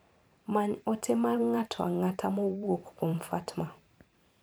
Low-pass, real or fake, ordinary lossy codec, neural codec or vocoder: none; fake; none; vocoder, 44.1 kHz, 128 mel bands every 512 samples, BigVGAN v2